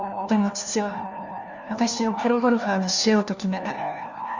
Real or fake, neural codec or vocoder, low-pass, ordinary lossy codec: fake; codec, 16 kHz, 1 kbps, FunCodec, trained on LibriTTS, 50 frames a second; 7.2 kHz; none